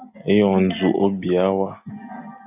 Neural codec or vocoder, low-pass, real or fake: none; 3.6 kHz; real